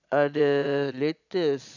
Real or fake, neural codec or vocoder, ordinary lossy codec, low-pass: fake; vocoder, 22.05 kHz, 80 mel bands, Vocos; none; 7.2 kHz